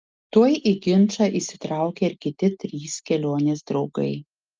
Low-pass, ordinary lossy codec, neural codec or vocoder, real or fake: 7.2 kHz; Opus, 24 kbps; none; real